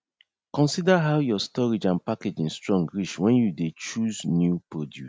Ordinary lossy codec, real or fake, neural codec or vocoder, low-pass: none; real; none; none